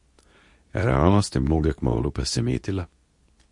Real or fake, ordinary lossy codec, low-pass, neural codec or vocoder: fake; MP3, 48 kbps; 10.8 kHz; codec, 24 kHz, 0.9 kbps, WavTokenizer, medium speech release version 2